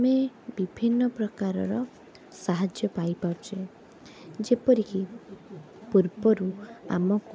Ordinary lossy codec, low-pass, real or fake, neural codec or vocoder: none; none; real; none